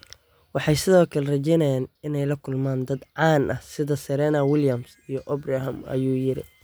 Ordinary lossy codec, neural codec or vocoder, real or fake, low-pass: none; none; real; none